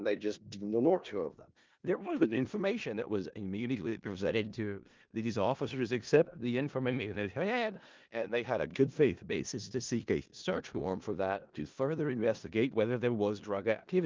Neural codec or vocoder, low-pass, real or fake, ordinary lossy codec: codec, 16 kHz in and 24 kHz out, 0.4 kbps, LongCat-Audio-Codec, four codebook decoder; 7.2 kHz; fake; Opus, 24 kbps